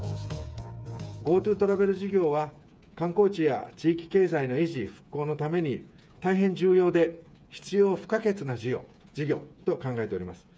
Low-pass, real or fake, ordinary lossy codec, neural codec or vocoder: none; fake; none; codec, 16 kHz, 8 kbps, FreqCodec, smaller model